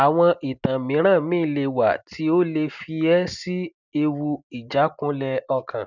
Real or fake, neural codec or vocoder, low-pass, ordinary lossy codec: real; none; 7.2 kHz; none